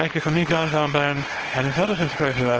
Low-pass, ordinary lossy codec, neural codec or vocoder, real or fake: 7.2 kHz; Opus, 16 kbps; codec, 24 kHz, 0.9 kbps, WavTokenizer, small release; fake